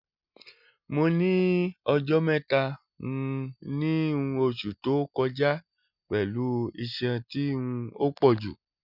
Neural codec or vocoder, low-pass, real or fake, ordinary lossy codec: none; 5.4 kHz; real; none